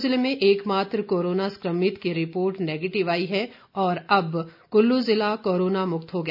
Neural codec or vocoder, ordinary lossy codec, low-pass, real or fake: none; none; 5.4 kHz; real